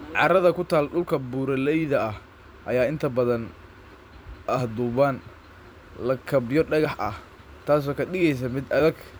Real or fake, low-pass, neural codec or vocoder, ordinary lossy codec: fake; none; vocoder, 44.1 kHz, 128 mel bands every 256 samples, BigVGAN v2; none